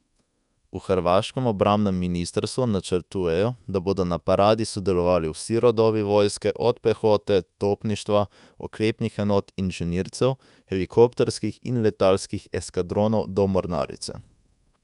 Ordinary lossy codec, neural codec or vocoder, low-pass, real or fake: none; codec, 24 kHz, 1.2 kbps, DualCodec; 10.8 kHz; fake